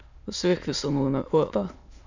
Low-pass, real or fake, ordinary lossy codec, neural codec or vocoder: 7.2 kHz; fake; Opus, 64 kbps; autoencoder, 22.05 kHz, a latent of 192 numbers a frame, VITS, trained on many speakers